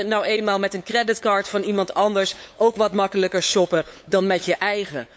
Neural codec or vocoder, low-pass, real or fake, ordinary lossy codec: codec, 16 kHz, 8 kbps, FunCodec, trained on LibriTTS, 25 frames a second; none; fake; none